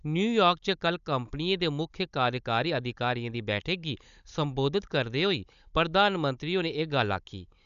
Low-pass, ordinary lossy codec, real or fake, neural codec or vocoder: 7.2 kHz; none; fake; codec, 16 kHz, 16 kbps, FunCodec, trained on Chinese and English, 50 frames a second